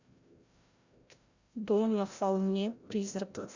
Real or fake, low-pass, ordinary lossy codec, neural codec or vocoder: fake; 7.2 kHz; Opus, 64 kbps; codec, 16 kHz, 0.5 kbps, FreqCodec, larger model